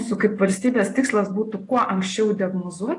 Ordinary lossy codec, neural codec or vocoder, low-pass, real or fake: AAC, 48 kbps; none; 10.8 kHz; real